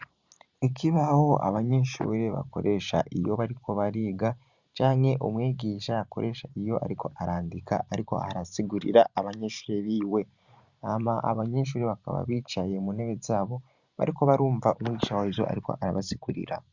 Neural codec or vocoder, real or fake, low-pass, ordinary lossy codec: vocoder, 44.1 kHz, 128 mel bands every 512 samples, BigVGAN v2; fake; 7.2 kHz; Opus, 64 kbps